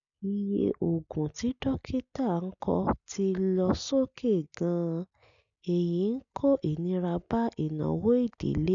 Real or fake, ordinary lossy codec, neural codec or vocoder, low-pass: real; none; none; 7.2 kHz